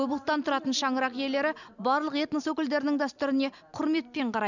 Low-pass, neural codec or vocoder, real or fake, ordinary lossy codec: 7.2 kHz; none; real; none